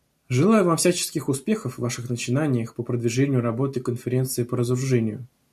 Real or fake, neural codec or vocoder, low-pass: real; none; 14.4 kHz